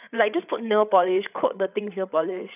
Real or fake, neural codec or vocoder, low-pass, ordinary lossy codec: fake; codec, 16 kHz, 8 kbps, FreqCodec, larger model; 3.6 kHz; none